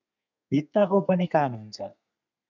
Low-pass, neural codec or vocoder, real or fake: 7.2 kHz; codec, 32 kHz, 1.9 kbps, SNAC; fake